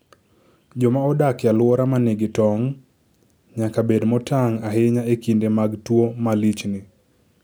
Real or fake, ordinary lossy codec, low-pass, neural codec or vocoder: real; none; none; none